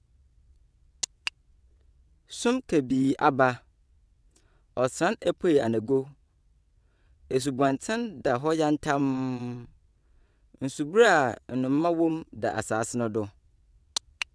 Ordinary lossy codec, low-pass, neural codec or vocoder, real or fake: none; none; vocoder, 22.05 kHz, 80 mel bands, WaveNeXt; fake